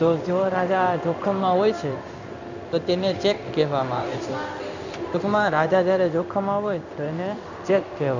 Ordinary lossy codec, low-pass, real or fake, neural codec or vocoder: none; 7.2 kHz; fake; codec, 16 kHz in and 24 kHz out, 1 kbps, XY-Tokenizer